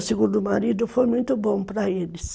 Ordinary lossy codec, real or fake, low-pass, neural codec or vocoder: none; real; none; none